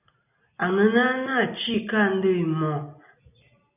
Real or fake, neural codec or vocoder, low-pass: real; none; 3.6 kHz